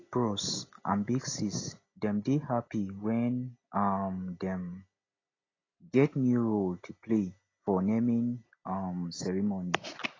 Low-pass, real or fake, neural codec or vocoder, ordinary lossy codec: 7.2 kHz; real; none; AAC, 32 kbps